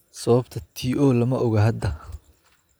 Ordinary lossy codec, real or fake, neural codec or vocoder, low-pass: none; real; none; none